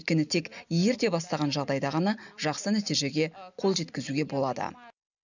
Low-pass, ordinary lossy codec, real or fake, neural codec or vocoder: 7.2 kHz; none; real; none